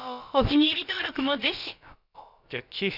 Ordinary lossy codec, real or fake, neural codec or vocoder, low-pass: none; fake; codec, 16 kHz, about 1 kbps, DyCAST, with the encoder's durations; 5.4 kHz